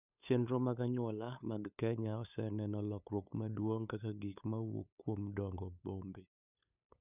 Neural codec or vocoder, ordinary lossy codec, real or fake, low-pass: codec, 16 kHz, 8 kbps, FunCodec, trained on LibriTTS, 25 frames a second; none; fake; 3.6 kHz